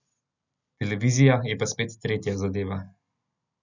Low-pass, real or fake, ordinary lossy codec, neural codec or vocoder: 7.2 kHz; real; none; none